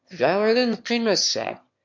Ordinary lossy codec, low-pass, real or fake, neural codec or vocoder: MP3, 48 kbps; 7.2 kHz; fake; autoencoder, 22.05 kHz, a latent of 192 numbers a frame, VITS, trained on one speaker